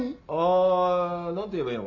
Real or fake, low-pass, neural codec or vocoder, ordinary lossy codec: real; 7.2 kHz; none; none